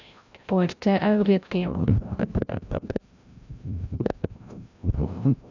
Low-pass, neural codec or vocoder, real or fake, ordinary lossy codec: 7.2 kHz; codec, 16 kHz, 0.5 kbps, FreqCodec, larger model; fake; none